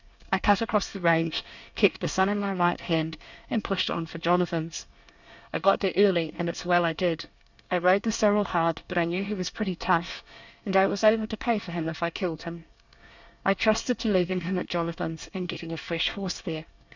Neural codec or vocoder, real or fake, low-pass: codec, 24 kHz, 1 kbps, SNAC; fake; 7.2 kHz